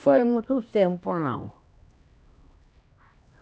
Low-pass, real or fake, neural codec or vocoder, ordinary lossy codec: none; fake; codec, 16 kHz, 1 kbps, X-Codec, HuBERT features, trained on LibriSpeech; none